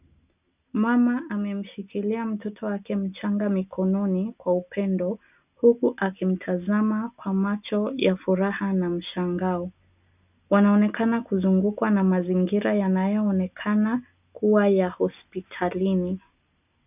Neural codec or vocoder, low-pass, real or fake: none; 3.6 kHz; real